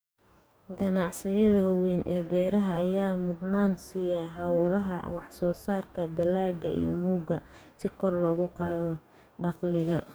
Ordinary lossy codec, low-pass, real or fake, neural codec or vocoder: none; none; fake; codec, 44.1 kHz, 2.6 kbps, DAC